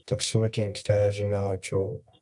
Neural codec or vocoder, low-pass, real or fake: codec, 24 kHz, 0.9 kbps, WavTokenizer, medium music audio release; 10.8 kHz; fake